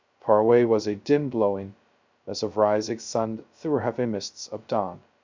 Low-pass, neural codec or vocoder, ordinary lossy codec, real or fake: 7.2 kHz; codec, 16 kHz, 0.2 kbps, FocalCodec; MP3, 64 kbps; fake